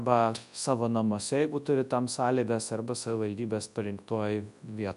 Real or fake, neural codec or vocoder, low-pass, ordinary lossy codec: fake; codec, 24 kHz, 0.9 kbps, WavTokenizer, large speech release; 10.8 kHz; AAC, 96 kbps